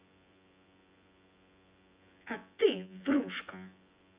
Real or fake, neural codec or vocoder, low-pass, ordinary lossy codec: fake; vocoder, 24 kHz, 100 mel bands, Vocos; 3.6 kHz; Opus, 64 kbps